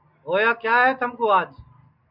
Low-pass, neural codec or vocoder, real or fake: 5.4 kHz; none; real